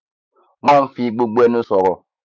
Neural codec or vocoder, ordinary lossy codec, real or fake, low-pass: autoencoder, 48 kHz, 128 numbers a frame, DAC-VAE, trained on Japanese speech; none; fake; 7.2 kHz